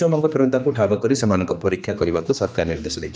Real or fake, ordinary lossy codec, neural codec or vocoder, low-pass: fake; none; codec, 16 kHz, 2 kbps, X-Codec, HuBERT features, trained on general audio; none